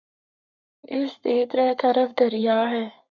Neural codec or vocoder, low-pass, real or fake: codec, 44.1 kHz, 7.8 kbps, Pupu-Codec; 7.2 kHz; fake